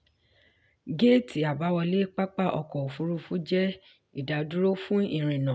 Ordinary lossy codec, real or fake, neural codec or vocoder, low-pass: none; real; none; none